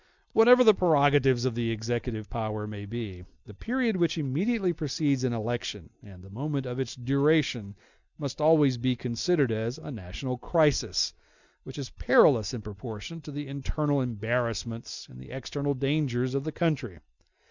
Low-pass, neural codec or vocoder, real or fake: 7.2 kHz; none; real